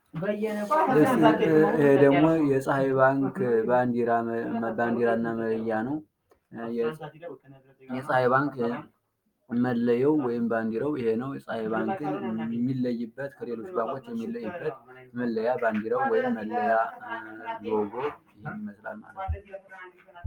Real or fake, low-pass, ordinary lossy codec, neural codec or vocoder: real; 19.8 kHz; Opus, 32 kbps; none